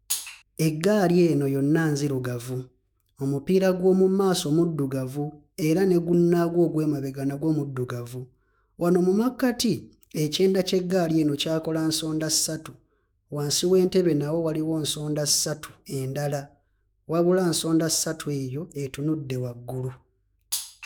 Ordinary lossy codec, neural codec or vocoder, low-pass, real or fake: none; autoencoder, 48 kHz, 128 numbers a frame, DAC-VAE, trained on Japanese speech; none; fake